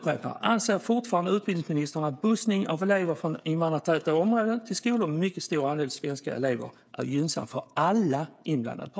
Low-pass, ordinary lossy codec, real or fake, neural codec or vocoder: none; none; fake; codec, 16 kHz, 8 kbps, FreqCodec, smaller model